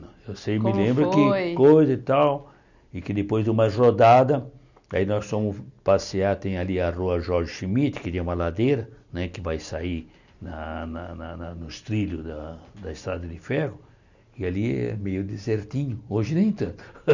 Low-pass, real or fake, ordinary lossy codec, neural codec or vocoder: 7.2 kHz; real; none; none